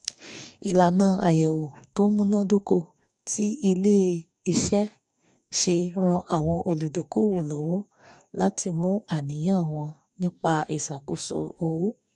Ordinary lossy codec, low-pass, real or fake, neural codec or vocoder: none; 10.8 kHz; fake; codec, 44.1 kHz, 2.6 kbps, DAC